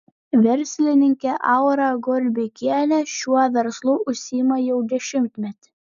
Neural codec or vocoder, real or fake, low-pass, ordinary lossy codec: none; real; 7.2 kHz; MP3, 96 kbps